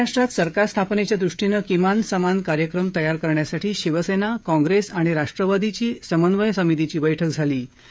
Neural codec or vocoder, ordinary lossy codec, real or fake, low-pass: codec, 16 kHz, 16 kbps, FreqCodec, smaller model; none; fake; none